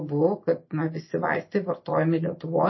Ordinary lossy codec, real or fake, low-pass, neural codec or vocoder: MP3, 24 kbps; real; 7.2 kHz; none